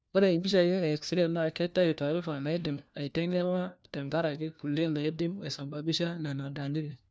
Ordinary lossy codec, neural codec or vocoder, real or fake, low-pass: none; codec, 16 kHz, 1 kbps, FunCodec, trained on LibriTTS, 50 frames a second; fake; none